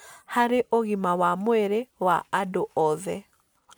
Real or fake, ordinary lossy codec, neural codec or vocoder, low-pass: real; none; none; none